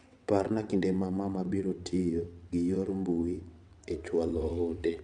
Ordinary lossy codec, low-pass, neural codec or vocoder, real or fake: none; 9.9 kHz; vocoder, 22.05 kHz, 80 mel bands, WaveNeXt; fake